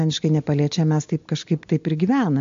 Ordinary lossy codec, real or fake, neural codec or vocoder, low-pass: MP3, 48 kbps; real; none; 7.2 kHz